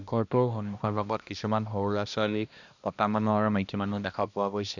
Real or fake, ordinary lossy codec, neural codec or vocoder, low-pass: fake; none; codec, 16 kHz, 1 kbps, X-Codec, HuBERT features, trained on balanced general audio; 7.2 kHz